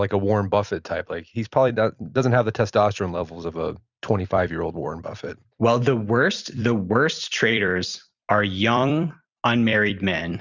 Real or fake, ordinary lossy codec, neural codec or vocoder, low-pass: fake; Opus, 64 kbps; vocoder, 44.1 kHz, 128 mel bands every 256 samples, BigVGAN v2; 7.2 kHz